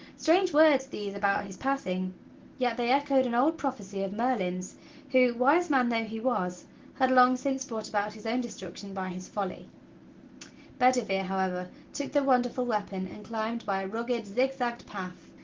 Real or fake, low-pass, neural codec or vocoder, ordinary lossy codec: real; 7.2 kHz; none; Opus, 16 kbps